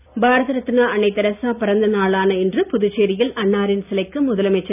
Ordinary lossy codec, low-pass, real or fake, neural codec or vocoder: none; 3.6 kHz; real; none